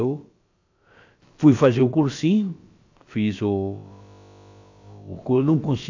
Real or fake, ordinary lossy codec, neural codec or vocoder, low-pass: fake; AAC, 48 kbps; codec, 16 kHz, about 1 kbps, DyCAST, with the encoder's durations; 7.2 kHz